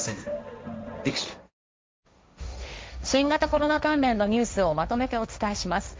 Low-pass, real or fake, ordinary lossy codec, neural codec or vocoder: none; fake; none; codec, 16 kHz, 1.1 kbps, Voila-Tokenizer